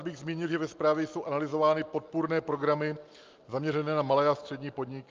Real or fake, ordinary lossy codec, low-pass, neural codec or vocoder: real; Opus, 24 kbps; 7.2 kHz; none